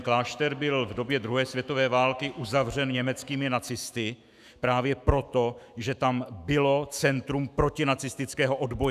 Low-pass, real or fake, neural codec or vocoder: 14.4 kHz; fake; autoencoder, 48 kHz, 128 numbers a frame, DAC-VAE, trained on Japanese speech